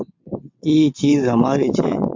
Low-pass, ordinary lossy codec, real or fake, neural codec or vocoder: 7.2 kHz; MP3, 64 kbps; fake; vocoder, 22.05 kHz, 80 mel bands, WaveNeXt